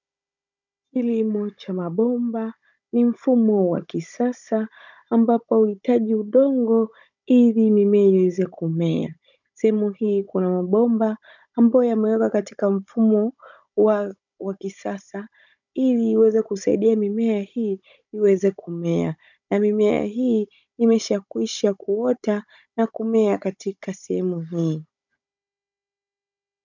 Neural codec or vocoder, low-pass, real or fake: codec, 16 kHz, 16 kbps, FunCodec, trained on Chinese and English, 50 frames a second; 7.2 kHz; fake